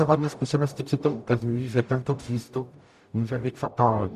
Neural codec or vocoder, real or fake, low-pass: codec, 44.1 kHz, 0.9 kbps, DAC; fake; 14.4 kHz